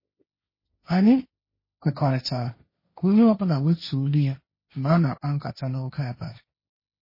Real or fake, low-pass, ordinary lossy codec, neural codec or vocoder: fake; 5.4 kHz; MP3, 24 kbps; codec, 16 kHz, 1.1 kbps, Voila-Tokenizer